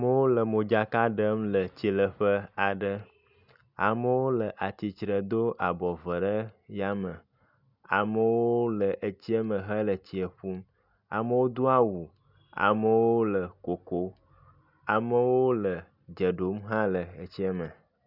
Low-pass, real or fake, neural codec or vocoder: 5.4 kHz; real; none